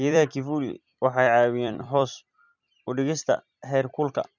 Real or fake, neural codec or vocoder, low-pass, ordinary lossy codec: fake; vocoder, 44.1 kHz, 128 mel bands every 256 samples, BigVGAN v2; 7.2 kHz; none